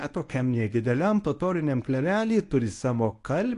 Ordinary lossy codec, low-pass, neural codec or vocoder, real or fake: AAC, 48 kbps; 10.8 kHz; codec, 24 kHz, 0.9 kbps, WavTokenizer, medium speech release version 1; fake